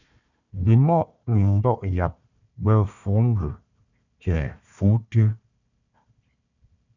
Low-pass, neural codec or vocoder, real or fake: 7.2 kHz; codec, 16 kHz, 1 kbps, FunCodec, trained on Chinese and English, 50 frames a second; fake